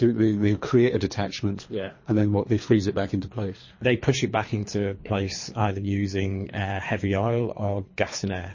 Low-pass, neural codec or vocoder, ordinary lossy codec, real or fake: 7.2 kHz; codec, 24 kHz, 3 kbps, HILCodec; MP3, 32 kbps; fake